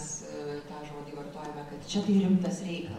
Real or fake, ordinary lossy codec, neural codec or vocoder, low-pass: real; MP3, 64 kbps; none; 19.8 kHz